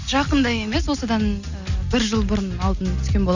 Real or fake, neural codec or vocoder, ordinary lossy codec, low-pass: real; none; none; 7.2 kHz